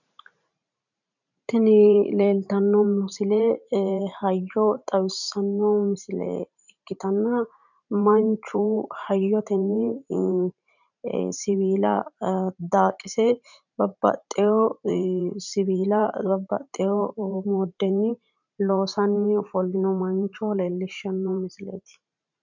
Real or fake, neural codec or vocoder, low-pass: fake; vocoder, 44.1 kHz, 128 mel bands every 512 samples, BigVGAN v2; 7.2 kHz